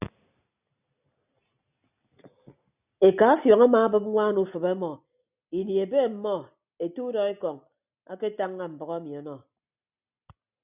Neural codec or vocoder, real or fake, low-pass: none; real; 3.6 kHz